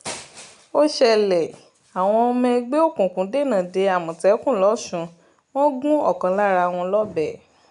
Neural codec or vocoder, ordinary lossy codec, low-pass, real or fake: none; none; 10.8 kHz; real